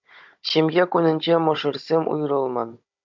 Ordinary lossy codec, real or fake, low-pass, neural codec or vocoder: MP3, 64 kbps; fake; 7.2 kHz; codec, 16 kHz, 16 kbps, FunCodec, trained on Chinese and English, 50 frames a second